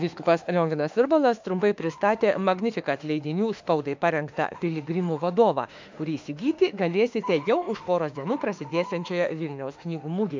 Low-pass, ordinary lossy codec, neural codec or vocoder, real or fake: 7.2 kHz; MP3, 64 kbps; autoencoder, 48 kHz, 32 numbers a frame, DAC-VAE, trained on Japanese speech; fake